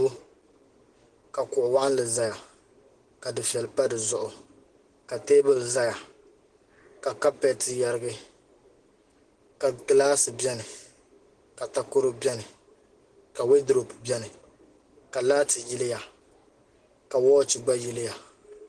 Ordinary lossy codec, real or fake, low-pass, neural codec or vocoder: Opus, 16 kbps; real; 10.8 kHz; none